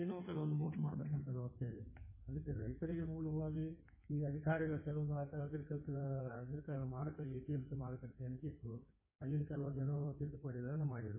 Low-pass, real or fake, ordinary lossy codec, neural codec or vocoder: 3.6 kHz; fake; MP3, 16 kbps; codec, 16 kHz in and 24 kHz out, 1.1 kbps, FireRedTTS-2 codec